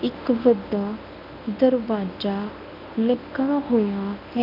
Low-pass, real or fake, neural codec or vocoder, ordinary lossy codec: 5.4 kHz; fake; codec, 24 kHz, 0.9 kbps, WavTokenizer, medium speech release version 1; none